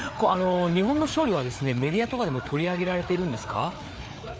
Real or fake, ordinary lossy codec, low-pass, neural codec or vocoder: fake; none; none; codec, 16 kHz, 4 kbps, FreqCodec, larger model